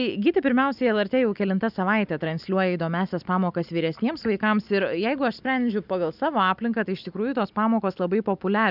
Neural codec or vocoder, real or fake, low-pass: none; real; 5.4 kHz